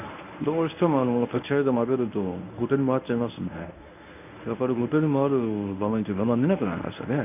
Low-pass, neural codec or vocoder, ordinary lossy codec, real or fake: 3.6 kHz; codec, 24 kHz, 0.9 kbps, WavTokenizer, medium speech release version 1; none; fake